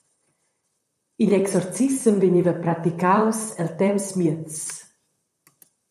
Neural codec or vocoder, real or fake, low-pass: vocoder, 44.1 kHz, 128 mel bands, Pupu-Vocoder; fake; 14.4 kHz